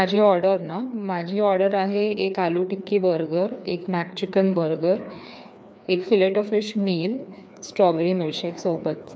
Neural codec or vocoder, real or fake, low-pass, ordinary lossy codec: codec, 16 kHz, 2 kbps, FreqCodec, larger model; fake; none; none